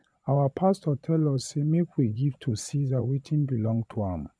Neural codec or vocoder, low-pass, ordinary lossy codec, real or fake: vocoder, 22.05 kHz, 80 mel bands, Vocos; none; none; fake